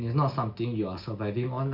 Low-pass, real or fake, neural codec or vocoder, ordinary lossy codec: 5.4 kHz; real; none; none